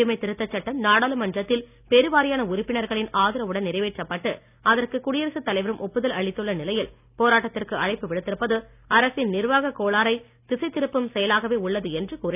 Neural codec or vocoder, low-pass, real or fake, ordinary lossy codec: none; 3.6 kHz; real; none